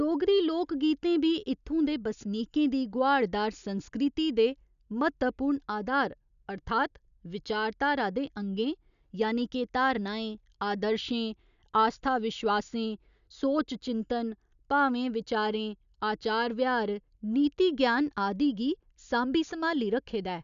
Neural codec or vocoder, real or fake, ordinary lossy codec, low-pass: none; real; none; 7.2 kHz